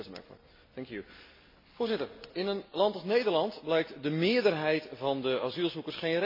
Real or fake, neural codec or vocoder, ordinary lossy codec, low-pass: real; none; MP3, 24 kbps; 5.4 kHz